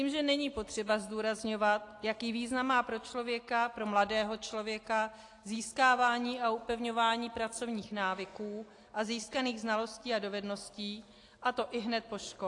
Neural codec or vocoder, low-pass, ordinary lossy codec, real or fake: none; 10.8 kHz; AAC, 48 kbps; real